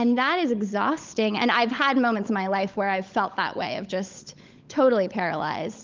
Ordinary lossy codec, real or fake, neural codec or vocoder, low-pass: Opus, 24 kbps; fake; codec, 16 kHz, 16 kbps, FunCodec, trained on LibriTTS, 50 frames a second; 7.2 kHz